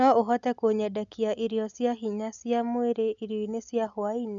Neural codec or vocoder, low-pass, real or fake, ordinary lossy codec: none; 7.2 kHz; real; none